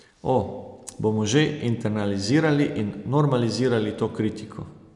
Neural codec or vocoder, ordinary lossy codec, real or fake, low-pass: none; none; real; 10.8 kHz